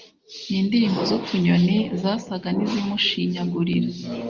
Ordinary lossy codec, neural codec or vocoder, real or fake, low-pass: Opus, 32 kbps; none; real; 7.2 kHz